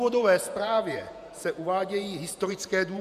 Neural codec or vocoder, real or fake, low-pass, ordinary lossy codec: vocoder, 48 kHz, 128 mel bands, Vocos; fake; 14.4 kHz; MP3, 96 kbps